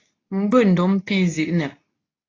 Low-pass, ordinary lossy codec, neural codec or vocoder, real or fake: 7.2 kHz; AAC, 32 kbps; codec, 24 kHz, 0.9 kbps, WavTokenizer, medium speech release version 2; fake